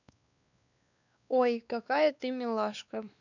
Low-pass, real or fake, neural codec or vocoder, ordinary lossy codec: 7.2 kHz; fake; codec, 16 kHz, 2 kbps, X-Codec, WavLM features, trained on Multilingual LibriSpeech; none